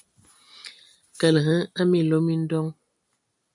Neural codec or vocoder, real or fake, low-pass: none; real; 10.8 kHz